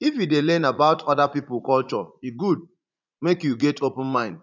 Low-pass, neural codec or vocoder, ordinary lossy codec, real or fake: 7.2 kHz; vocoder, 44.1 kHz, 80 mel bands, Vocos; none; fake